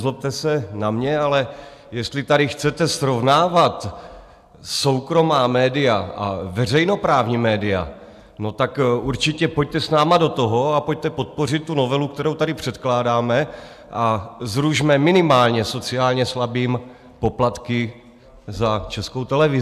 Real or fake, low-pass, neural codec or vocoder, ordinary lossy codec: real; 14.4 kHz; none; AAC, 96 kbps